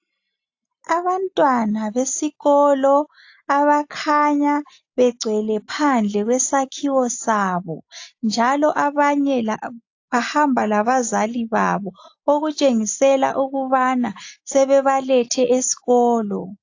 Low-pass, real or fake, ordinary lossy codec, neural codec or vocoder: 7.2 kHz; real; AAC, 48 kbps; none